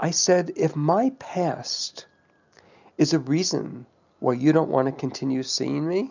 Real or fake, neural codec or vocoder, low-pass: real; none; 7.2 kHz